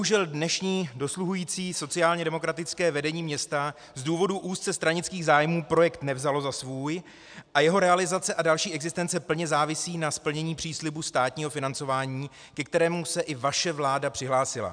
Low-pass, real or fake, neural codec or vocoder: 9.9 kHz; real; none